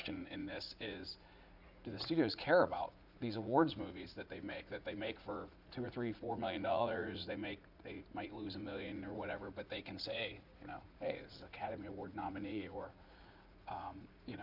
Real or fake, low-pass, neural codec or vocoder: fake; 5.4 kHz; vocoder, 44.1 kHz, 80 mel bands, Vocos